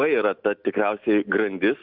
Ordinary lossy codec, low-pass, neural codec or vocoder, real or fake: Opus, 64 kbps; 5.4 kHz; none; real